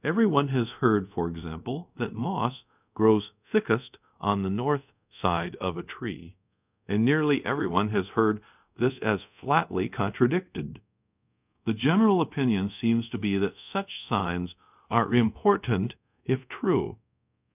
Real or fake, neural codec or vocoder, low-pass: fake; codec, 24 kHz, 0.5 kbps, DualCodec; 3.6 kHz